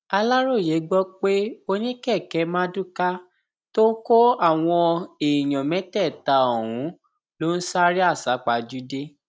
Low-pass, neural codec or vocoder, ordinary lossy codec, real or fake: none; none; none; real